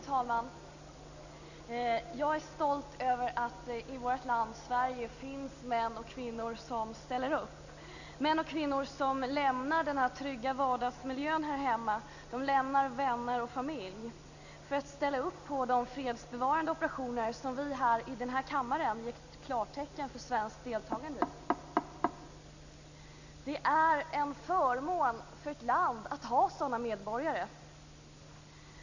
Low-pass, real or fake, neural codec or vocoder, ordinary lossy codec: 7.2 kHz; real; none; none